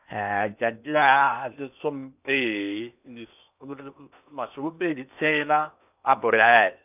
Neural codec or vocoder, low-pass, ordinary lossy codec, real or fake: codec, 16 kHz in and 24 kHz out, 0.8 kbps, FocalCodec, streaming, 65536 codes; 3.6 kHz; none; fake